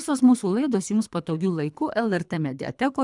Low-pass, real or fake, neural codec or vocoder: 10.8 kHz; fake; codec, 24 kHz, 3 kbps, HILCodec